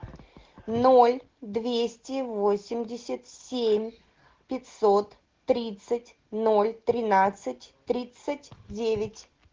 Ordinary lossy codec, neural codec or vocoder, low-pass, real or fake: Opus, 16 kbps; none; 7.2 kHz; real